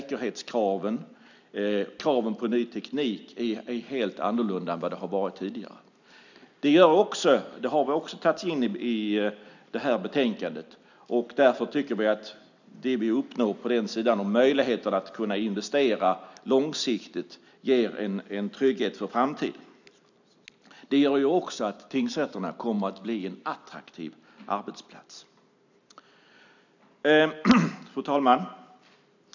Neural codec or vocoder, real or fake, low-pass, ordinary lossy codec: none; real; 7.2 kHz; none